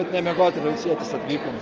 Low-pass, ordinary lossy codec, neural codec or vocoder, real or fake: 7.2 kHz; Opus, 24 kbps; none; real